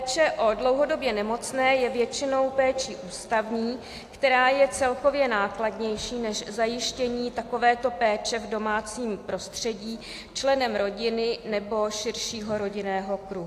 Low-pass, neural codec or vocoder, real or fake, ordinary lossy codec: 14.4 kHz; none; real; AAC, 64 kbps